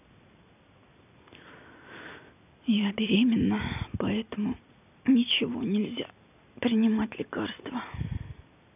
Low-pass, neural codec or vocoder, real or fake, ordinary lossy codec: 3.6 kHz; none; real; none